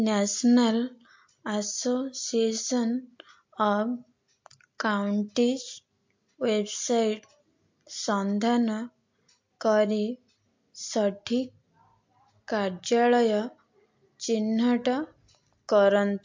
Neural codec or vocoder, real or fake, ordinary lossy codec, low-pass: none; real; MP3, 48 kbps; 7.2 kHz